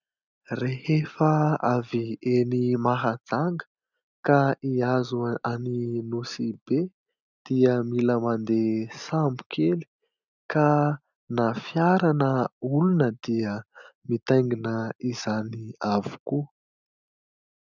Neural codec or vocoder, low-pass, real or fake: none; 7.2 kHz; real